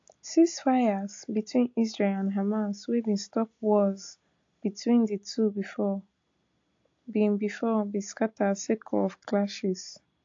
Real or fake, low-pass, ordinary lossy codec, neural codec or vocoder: real; 7.2 kHz; AAC, 48 kbps; none